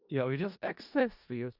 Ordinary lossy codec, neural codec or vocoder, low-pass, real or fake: Opus, 64 kbps; codec, 16 kHz in and 24 kHz out, 0.4 kbps, LongCat-Audio-Codec, four codebook decoder; 5.4 kHz; fake